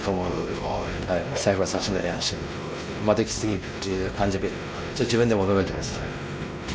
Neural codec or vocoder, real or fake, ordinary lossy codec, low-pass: codec, 16 kHz, 1 kbps, X-Codec, WavLM features, trained on Multilingual LibriSpeech; fake; none; none